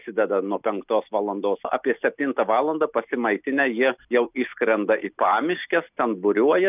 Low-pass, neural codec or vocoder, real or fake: 3.6 kHz; none; real